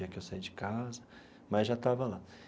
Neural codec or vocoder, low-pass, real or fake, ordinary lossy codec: none; none; real; none